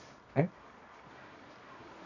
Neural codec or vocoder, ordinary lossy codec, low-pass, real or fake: codec, 24 kHz, 0.9 kbps, WavTokenizer, medium music audio release; none; 7.2 kHz; fake